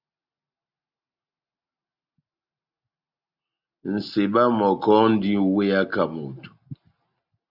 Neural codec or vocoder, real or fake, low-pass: none; real; 5.4 kHz